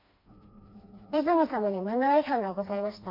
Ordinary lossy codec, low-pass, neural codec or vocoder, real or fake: MP3, 32 kbps; 5.4 kHz; codec, 16 kHz, 2 kbps, FreqCodec, smaller model; fake